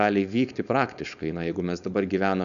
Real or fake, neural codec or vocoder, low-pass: fake; codec, 16 kHz, 4.8 kbps, FACodec; 7.2 kHz